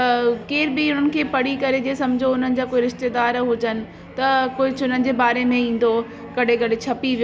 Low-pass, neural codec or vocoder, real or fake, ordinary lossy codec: none; none; real; none